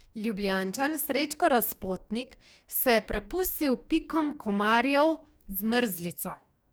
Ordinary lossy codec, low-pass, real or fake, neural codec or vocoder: none; none; fake; codec, 44.1 kHz, 2.6 kbps, DAC